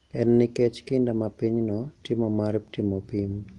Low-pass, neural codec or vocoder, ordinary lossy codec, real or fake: 10.8 kHz; none; Opus, 24 kbps; real